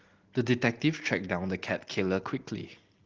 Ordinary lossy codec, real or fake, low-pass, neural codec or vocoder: Opus, 16 kbps; real; 7.2 kHz; none